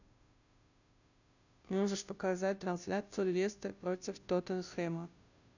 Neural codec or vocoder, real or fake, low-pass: codec, 16 kHz, 0.5 kbps, FunCodec, trained on Chinese and English, 25 frames a second; fake; 7.2 kHz